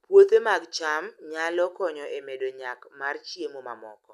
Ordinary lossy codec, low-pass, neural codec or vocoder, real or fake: none; 14.4 kHz; none; real